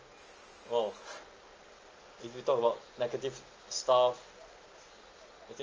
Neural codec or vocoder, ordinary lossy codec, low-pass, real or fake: none; Opus, 24 kbps; 7.2 kHz; real